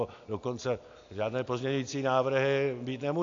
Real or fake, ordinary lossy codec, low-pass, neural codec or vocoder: real; AAC, 48 kbps; 7.2 kHz; none